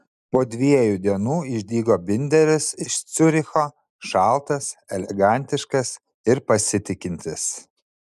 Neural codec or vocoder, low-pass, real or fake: none; 14.4 kHz; real